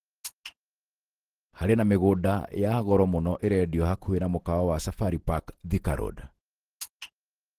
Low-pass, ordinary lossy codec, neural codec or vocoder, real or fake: 14.4 kHz; Opus, 16 kbps; vocoder, 48 kHz, 128 mel bands, Vocos; fake